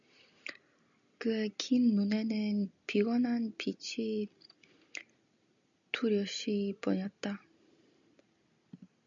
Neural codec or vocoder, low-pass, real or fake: none; 7.2 kHz; real